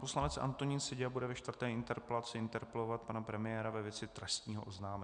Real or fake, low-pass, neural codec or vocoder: real; 9.9 kHz; none